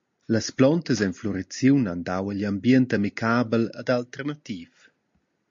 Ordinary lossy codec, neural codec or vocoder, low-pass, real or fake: MP3, 48 kbps; none; 7.2 kHz; real